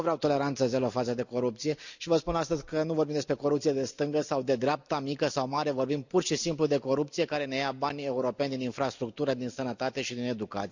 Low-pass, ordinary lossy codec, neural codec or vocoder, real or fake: 7.2 kHz; none; none; real